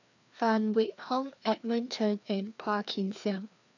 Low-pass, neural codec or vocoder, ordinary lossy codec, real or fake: 7.2 kHz; codec, 16 kHz, 2 kbps, FreqCodec, larger model; none; fake